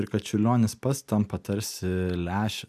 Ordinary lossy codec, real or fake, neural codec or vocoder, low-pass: MP3, 96 kbps; real; none; 14.4 kHz